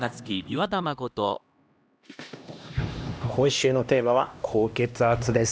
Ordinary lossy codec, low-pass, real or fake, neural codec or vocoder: none; none; fake; codec, 16 kHz, 1 kbps, X-Codec, HuBERT features, trained on LibriSpeech